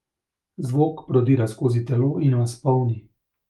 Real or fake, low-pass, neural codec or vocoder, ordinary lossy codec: fake; 19.8 kHz; autoencoder, 48 kHz, 128 numbers a frame, DAC-VAE, trained on Japanese speech; Opus, 32 kbps